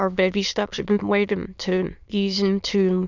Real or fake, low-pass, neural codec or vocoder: fake; 7.2 kHz; autoencoder, 22.05 kHz, a latent of 192 numbers a frame, VITS, trained on many speakers